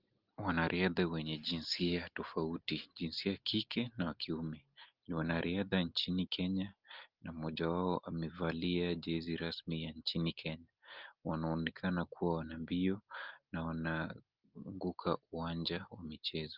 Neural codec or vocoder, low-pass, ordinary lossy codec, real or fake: none; 5.4 kHz; Opus, 24 kbps; real